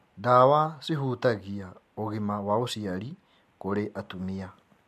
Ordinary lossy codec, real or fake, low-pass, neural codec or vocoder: MP3, 64 kbps; real; 14.4 kHz; none